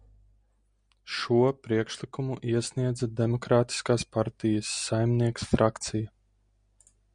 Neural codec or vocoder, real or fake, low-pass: none; real; 9.9 kHz